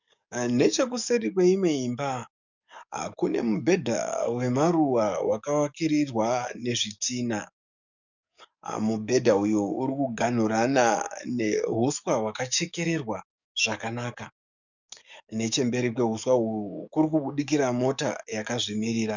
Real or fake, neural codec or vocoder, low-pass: fake; codec, 44.1 kHz, 7.8 kbps, DAC; 7.2 kHz